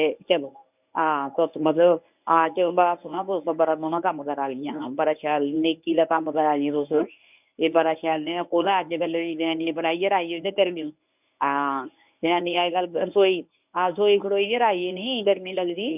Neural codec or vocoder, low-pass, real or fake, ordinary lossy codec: codec, 24 kHz, 0.9 kbps, WavTokenizer, medium speech release version 1; 3.6 kHz; fake; none